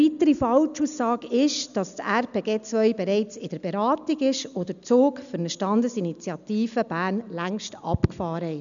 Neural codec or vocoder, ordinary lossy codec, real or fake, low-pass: none; none; real; 7.2 kHz